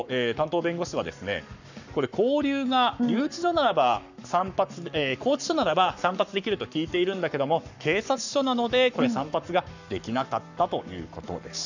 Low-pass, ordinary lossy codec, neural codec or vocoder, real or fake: 7.2 kHz; none; codec, 44.1 kHz, 7.8 kbps, Pupu-Codec; fake